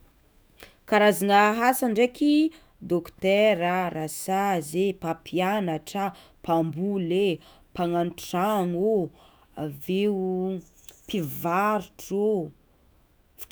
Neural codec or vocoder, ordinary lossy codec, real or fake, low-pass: autoencoder, 48 kHz, 128 numbers a frame, DAC-VAE, trained on Japanese speech; none; fake; none